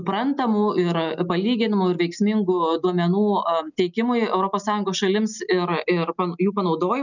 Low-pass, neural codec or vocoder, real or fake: 7.2 kHz; none; real